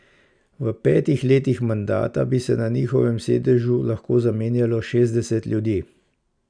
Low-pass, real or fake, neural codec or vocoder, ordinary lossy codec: 9.9 kHz; real; none; none